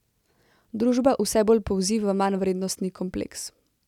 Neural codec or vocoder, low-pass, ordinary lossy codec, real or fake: none; 19.8 kHz; none; real